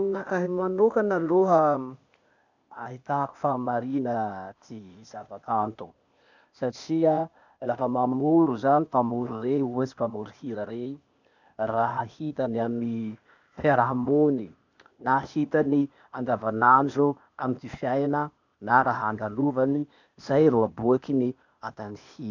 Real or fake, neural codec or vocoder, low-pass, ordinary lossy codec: fake; codec, 16 kHz, 0.8 kbps, ZipCodec; 7.2 kHz; none